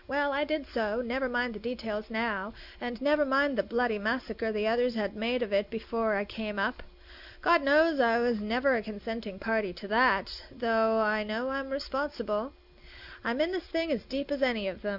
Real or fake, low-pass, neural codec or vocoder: real; 5.4 kHz; none